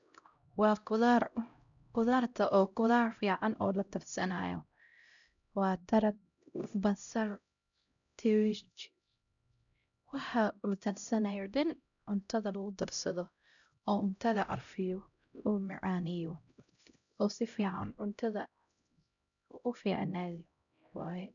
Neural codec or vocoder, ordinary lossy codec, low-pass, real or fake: codec, 16 kHz, 0.5 kbps, X-Codec, HuBERT features, trained on LibriSpeech; none; 7.2 kHz; fake